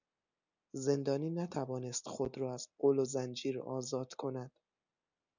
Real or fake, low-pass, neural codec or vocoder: real; 7.2 kHz; none